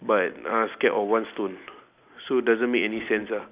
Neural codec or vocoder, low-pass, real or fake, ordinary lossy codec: none; 3.6 kHz; real; Opus, 64 kbps